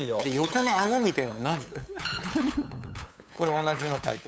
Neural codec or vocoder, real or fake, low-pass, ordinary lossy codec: codec, 16 kHz, 8 kbps, FunCodec, trained on LibriTTS, 25 frames a second; fake; none; none